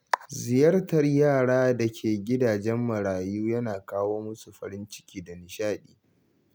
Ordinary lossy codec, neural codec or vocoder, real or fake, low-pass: none; none; real; none